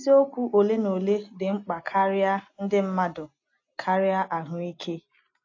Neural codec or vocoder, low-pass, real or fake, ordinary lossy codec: none; 7.2 kHz; real; AAC, 48 kbps